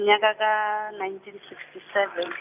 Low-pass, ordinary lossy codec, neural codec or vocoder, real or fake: 3.6 kHz; none; none; real